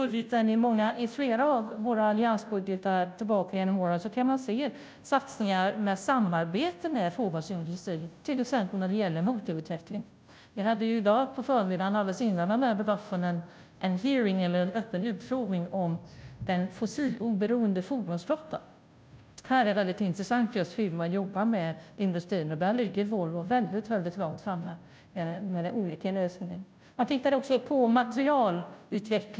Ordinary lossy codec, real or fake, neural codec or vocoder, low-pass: none; fake; codec, 16 kHz, 0.5 kbps, FunCodec, trained on Chinese and English, 25 frames a second; none